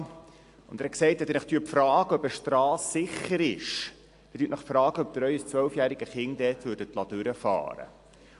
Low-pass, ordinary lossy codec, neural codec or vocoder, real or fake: 10.8 kHz; AAC, 64 kbps; none; real